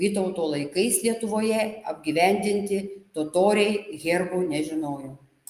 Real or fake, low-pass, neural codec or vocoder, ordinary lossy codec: real; 14.4 kHz; none; Opus, 32 kbps